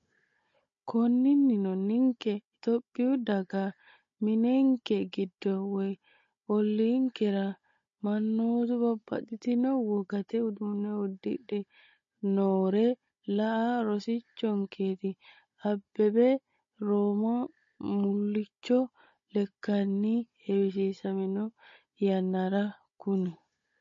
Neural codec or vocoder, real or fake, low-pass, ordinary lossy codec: codec, 16 kHz, 16 kbps, FunCodec, trained on Chinese and English, 50 frames a second; fake; 7.2 kHz; MP3, 32 kbps